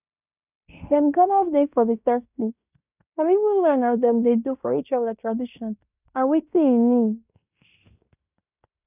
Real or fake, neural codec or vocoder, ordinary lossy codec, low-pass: fake; codec, 16 kHz in and 24 kHz out, 0.9 kbps, LongCat-Audio-Codec, fine tuned four codebook decoder; none; 3.6 kHz